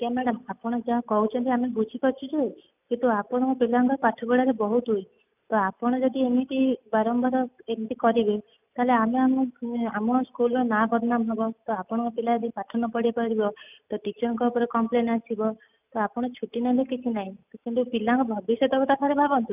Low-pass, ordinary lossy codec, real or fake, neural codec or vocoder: 3.6 kHz; none; real; none